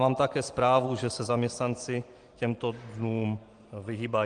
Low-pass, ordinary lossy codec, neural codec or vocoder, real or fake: 9.9 kHz; Opus, 24 kbps; none; real